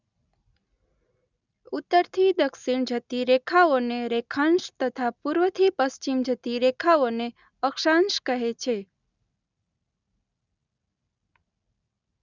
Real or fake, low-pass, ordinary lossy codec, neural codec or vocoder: real; 7.2 kHz; none; none